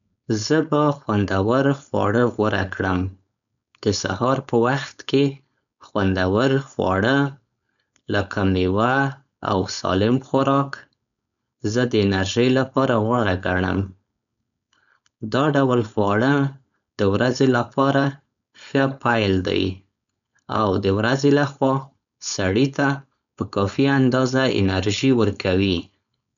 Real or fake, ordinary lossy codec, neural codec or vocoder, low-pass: fake; MP3, 96 kbps; codec, 16 kHz, 4.8 kbps, FACodec; 7.2 kHz